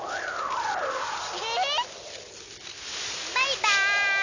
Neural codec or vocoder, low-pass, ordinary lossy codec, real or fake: none; 7.2 kHz; none; real